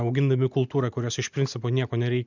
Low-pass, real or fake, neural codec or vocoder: 7.2 kHz; real; none